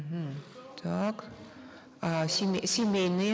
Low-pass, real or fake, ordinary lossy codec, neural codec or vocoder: none; real; none; none